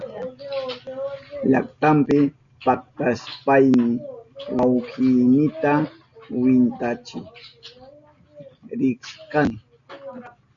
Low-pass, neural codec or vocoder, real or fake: 7.2 kHz; none; real